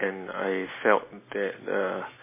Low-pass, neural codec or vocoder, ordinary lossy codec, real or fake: 3.6 kHz; none; MP3, 16 kbps; real